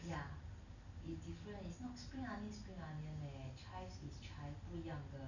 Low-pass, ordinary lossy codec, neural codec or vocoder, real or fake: 7.2 kHz; none; none; real